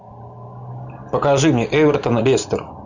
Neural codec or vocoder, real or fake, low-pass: none; real; 7.2 kHz